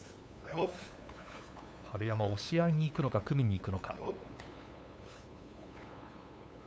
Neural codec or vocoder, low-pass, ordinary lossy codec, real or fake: codec, 16 kHz, 8 kbps, FunCodec, trained on LibriTTS, 25 frames a second; none; none; fake